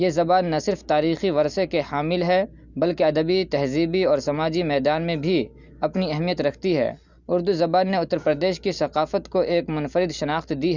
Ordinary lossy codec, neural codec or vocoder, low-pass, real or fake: Opus, 64 kbps; none; 7.2 kHz; real